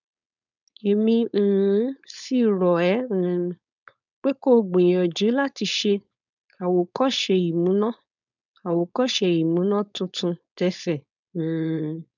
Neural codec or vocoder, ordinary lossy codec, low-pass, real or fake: codec, 16 kHz, 4.8 kbps, FACodec; none; 7.2 kHz; fake